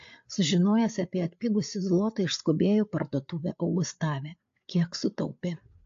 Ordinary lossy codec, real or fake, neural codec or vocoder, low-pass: AAC, 48 kbps; fake; codec, 16 kHz, 8 kbps, FreqCodec, larger model; 7.2 kHz